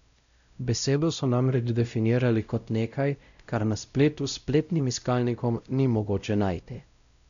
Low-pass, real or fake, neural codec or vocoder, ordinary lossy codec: 7.2 kHz; fake; codec, 16 kHz, 0.5 kbps, X-Codec, WavLM features, trained on Multilingual LibriSpeech; none